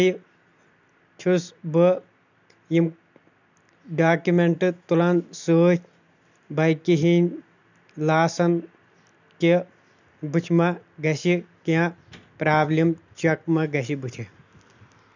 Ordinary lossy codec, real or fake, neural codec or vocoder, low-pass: none; real; none; 7.2 kHz